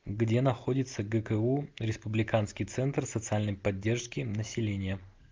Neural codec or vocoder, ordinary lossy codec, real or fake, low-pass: none; Opus, 24 kbps; real; 7.2 kHz